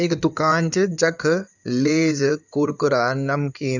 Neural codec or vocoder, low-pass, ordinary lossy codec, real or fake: codec, 16 kHz in and 24 kHz out, 2.2 kbps, FireRedTTS-2 codec; 7.2 kHz; none; fake